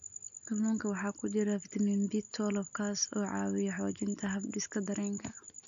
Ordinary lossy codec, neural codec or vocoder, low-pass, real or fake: AAC, 64 kbps; none; 7.2 kHz; real